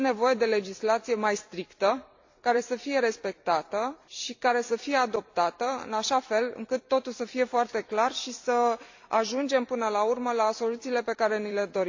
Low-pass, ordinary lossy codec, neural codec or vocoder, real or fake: 7.2 kHz; AAC, 48 kbps; vocoder, 44.1 kHz, 128 mel bands every 256 samples, BigVGAN v2; fake